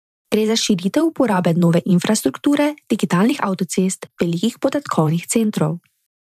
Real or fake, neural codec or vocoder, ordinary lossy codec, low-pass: fake; vocoder, 44.1 kHz, 128 mel bands every 512 samples, BigVGAN v2; none; 14.4 kHz